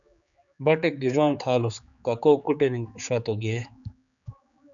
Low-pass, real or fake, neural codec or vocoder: 7.2 kHz; fake; codec, 16 kHz, 4 kbps, X-Codec, HuBERT features, trained on general audio